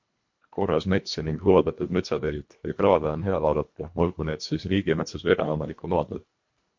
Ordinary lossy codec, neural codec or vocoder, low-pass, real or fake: MP3, 48 kbps; codec, 24 kHz, 1.5 kbps, HILCodec; 7.2 kHz; fake